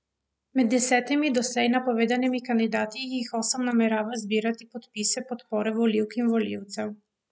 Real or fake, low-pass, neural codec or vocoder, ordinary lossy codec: real; none; none; none